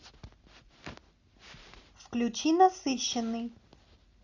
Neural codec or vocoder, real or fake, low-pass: none; real; 7.2 kHz